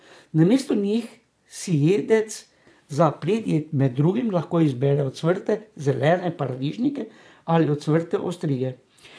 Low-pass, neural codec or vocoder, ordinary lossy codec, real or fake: none; vocoder, 22.05 kHz, 80 mel bands, Vocos; none; fake